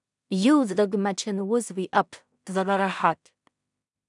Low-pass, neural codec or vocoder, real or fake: 10.8 kHz; codec, 16 kHz in and 24 kHz out, 0.4 kbps, LongCat-Audio-Codec, two codebook decoder; fake